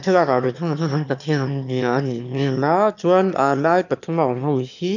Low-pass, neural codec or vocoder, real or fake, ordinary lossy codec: 7.2 kHz; autoencoder, 22.05 kHz, a latent of 192 numbers a frame, VITS, trained on one speaker; fake; none